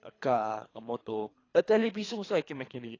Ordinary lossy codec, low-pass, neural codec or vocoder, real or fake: AAC, 32 kbps; 7.2 kHz; codec, 24 kHz, 3 kbps, HILCodec; fake